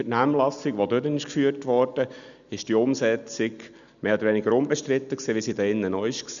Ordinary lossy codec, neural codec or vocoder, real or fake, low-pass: none; none; real; 7.2 kHz